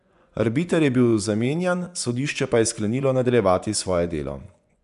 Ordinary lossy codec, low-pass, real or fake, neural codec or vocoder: none; 10.8 kHz; fake; vocoder, 24 kHz, 100 mel bands, Vocos